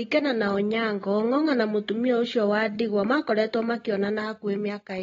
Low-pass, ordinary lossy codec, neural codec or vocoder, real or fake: 7.2 kHz; AAC, 24 kbps; none; real